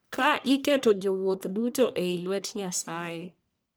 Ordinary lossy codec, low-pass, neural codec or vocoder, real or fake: none; none; codec, 44.1 kHz, 1.7 kbps, Pupu-Codec; fake